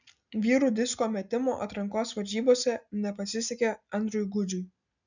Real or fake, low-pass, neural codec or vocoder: real; 7.2 kHz; none